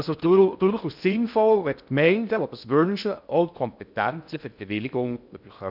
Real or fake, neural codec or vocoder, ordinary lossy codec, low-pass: fake; codec, 16 kHz in and 24 kHz out, 0.6 kbps, FocalCodec, streaming, 2048 codes; none; 5.4 kHz